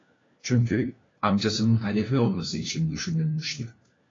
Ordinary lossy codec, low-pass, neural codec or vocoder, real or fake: AAC, 32 kbps; 7.2 kHz; codec, 16 kHz, 1 kbps, FunCodec, trained on LibriTTS, 50 frames a second; fake